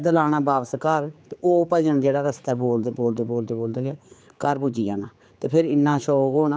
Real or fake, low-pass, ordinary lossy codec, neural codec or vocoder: fake; none; none; codec, 16 kHz, 4 kbps, X-Codec, HuBERT features, trained on general audio